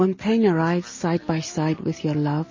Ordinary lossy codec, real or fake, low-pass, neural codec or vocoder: MP3, 32 kbps; real; 7.2 kHz; none